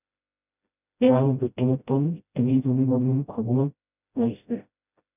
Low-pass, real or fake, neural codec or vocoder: 3.6 kHz; fake; codec, 16 kHz, 0.5 kbps, FreqCodec, smaller model